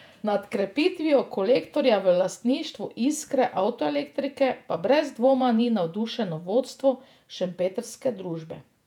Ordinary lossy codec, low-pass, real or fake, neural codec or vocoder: none; 19.8 kHz; fake; vocoder, 48 kHz, 128 mel bands, Vocos